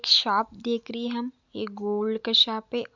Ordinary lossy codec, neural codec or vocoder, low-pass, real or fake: Opus, 64 kbps; none; 7.2 kHz; real